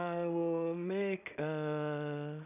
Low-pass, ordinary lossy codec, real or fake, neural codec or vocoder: 3.6 kHz; none; fake; codec, 16 kHz in and 24 kHz out, 0.4 kbps, LongCat-Audio-Codec, two codebook decoder